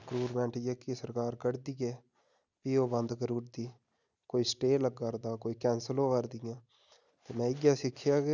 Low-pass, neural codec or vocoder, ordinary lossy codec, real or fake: 7.2 kHz; none; none; real